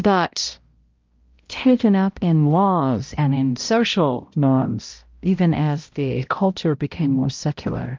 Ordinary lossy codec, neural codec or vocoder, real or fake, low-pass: Opus, 24 kbps; codec, 16 kHz, 0.5 kbps, X-Codec, HuBERT features, trained on balanced general audio; fake; 7.2 kHz